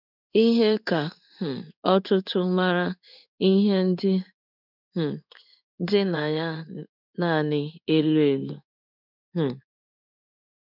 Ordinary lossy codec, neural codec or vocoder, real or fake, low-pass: none; codec, 16 kHz in and 24 kHz out, 1 kbps, XY-Tokenizer; fake; 5.4 kHz